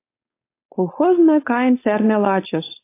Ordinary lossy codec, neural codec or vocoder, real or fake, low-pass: AAC, 24 kbps; codec, 16 kHz, 4.8 kbps, FACodec; fake; 3.6 kHz